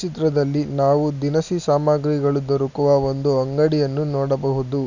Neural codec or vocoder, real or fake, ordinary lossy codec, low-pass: none; real; none; 7.2 kHz